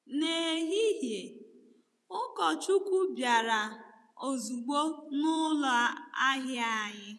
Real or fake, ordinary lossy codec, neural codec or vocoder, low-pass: fake; none; vocoder, 24 kHz, 100 mel bands, Vocos; none